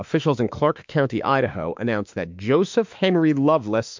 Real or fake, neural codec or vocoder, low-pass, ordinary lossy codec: fake; autoencoder, 48 kHz, 32 numbers a frame, DAC-VAE, trained on Japanese speech; 7.2 kHz; MP3, 64 kbps